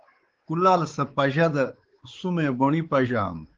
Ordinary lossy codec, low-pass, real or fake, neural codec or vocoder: Opus, 16 kbps; 7.2 kHz; fake; codec, 16 kHz, 4 kbps, X-Codec, WavLM features, trained on Multilingual LibriSpeech